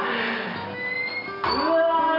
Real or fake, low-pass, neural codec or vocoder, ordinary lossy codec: real; 5.4 kHz; none; none